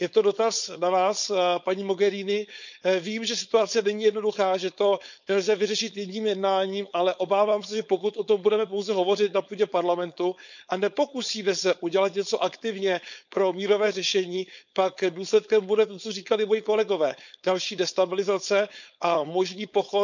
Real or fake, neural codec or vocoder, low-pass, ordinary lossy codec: fake; codec, 16 kHz, 4.8 kbps, FACodec; 7.2 kHz; none